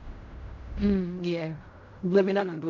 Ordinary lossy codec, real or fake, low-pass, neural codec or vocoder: MP3, 48 kbps; fake; 7.2 kHz; codec, 16 kHz in and 24 kHz out, 0.4 kbps, LongCat-Audio-Codec, fine tuned four codebook decoder